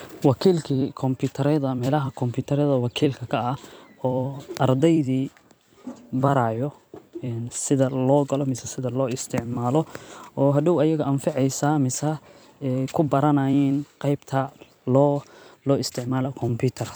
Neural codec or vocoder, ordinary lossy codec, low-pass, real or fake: vocoder, 44.1 kHz, 128 mel bands every 256 samples, BigVGAN v2; none; none; fake